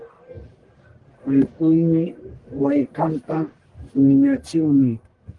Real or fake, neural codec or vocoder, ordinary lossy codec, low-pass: fake; codec, 44.1 kHz, 1.7 kbps, Pupu-Codec; Opus, 24 kbps; 10.8 kHz